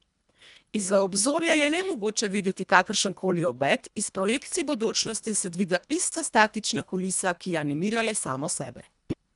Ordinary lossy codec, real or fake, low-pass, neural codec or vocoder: none; fake; 10.8 kHz; codec, 24 kHz, 1.5 kbps, HILCodec